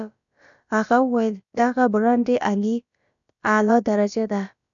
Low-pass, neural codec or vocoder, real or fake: 7.2 kHz; codec, 16 kHz, about 1 kbps, DyCAST, with the encoder's durations; fake